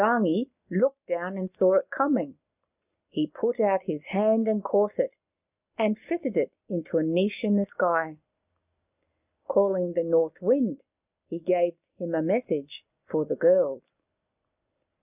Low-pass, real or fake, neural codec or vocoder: 3.6 kHz; real; none